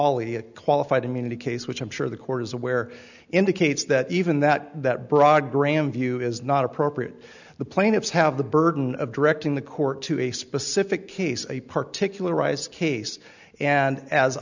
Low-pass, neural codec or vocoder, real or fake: 7.2 kHz; none; real